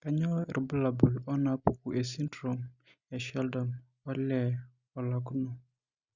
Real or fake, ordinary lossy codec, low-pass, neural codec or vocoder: real; Opus, 64 kbps; 7.2 kHz; none